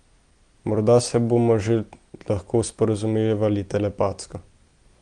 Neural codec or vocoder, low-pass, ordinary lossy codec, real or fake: none; 9.9 kHz; Opus, 24 kbps; real